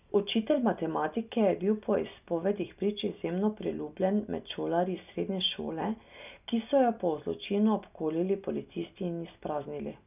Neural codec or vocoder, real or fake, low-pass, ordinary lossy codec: none; real; 3.6 kHz; none